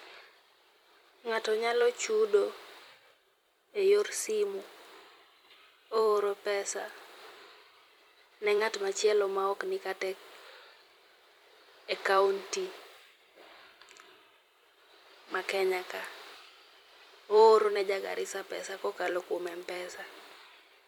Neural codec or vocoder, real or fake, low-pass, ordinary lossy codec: vocoder, 44.1 kHz, 128 mel bands every 256 samples, BigVGAN v2; fake; 19.8 kHz; MP3, 96 kbps